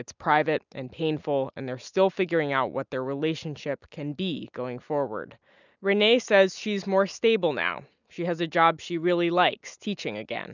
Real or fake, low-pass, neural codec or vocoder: real; 7.2 kHz; none